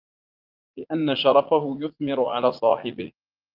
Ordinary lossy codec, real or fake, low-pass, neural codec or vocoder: Opus, 32 kbps; fake; 5.4 kHz; vocoder, 44.1 kHz, 80 mel bands, Vocos